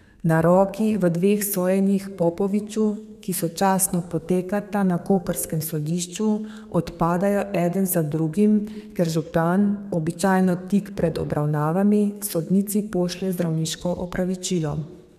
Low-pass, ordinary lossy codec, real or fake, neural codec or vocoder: 14.4 kHz; none; fake; codec, 32 kHz, 1.9 kbps, SNAC